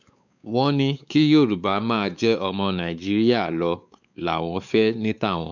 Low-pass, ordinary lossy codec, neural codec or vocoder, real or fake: 7.2 kHz; none; codec, 16 kHz, 4 kbps, X-Codec, WavLM features, trained on Multilingual LibriSpeech; fake